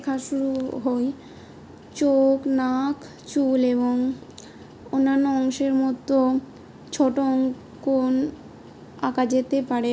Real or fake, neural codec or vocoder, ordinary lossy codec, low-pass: real; none; none; none